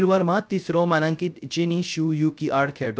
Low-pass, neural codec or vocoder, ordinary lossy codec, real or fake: none; codec, 16 kHz, 0.3 kbps, FocalCodec; none; fake